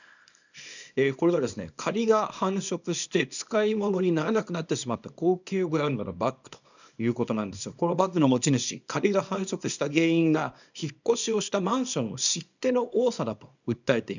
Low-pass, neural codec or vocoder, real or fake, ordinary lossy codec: 7.2 kHz; codec, 24 kHz, 0.9 kbps, WavTokenizer, small release; fake; none